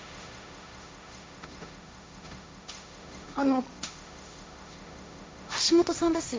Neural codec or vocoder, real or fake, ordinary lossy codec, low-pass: codec, 16 kHz, 1.1 kbps, Voila-Tokenizer; fake; none; none